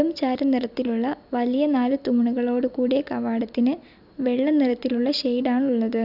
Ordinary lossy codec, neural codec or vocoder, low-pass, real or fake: none; none; 5.4 kHz; real